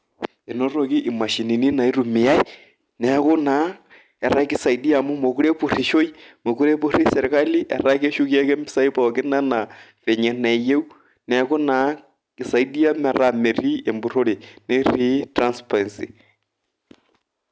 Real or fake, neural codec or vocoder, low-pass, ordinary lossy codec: real; none; none; none